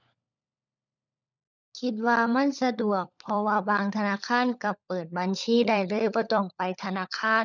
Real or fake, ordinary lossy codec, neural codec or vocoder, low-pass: fake; none; codec, 16 kHz, 16 kbps, FunCodec, trained on LibriTTS, 50 frames a second; 7.2 kHz